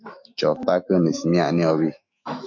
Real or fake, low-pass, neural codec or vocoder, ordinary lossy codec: fake; 7.2 kHz; autoencoder, 48 kHz, 128 numbers a frame, DAC-VAE, trained on Japanese speech; MP3, 48 kbps